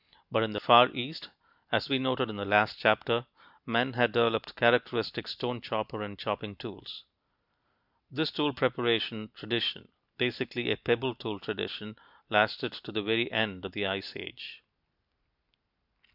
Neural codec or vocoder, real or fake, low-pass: none; real; 5.4 kHz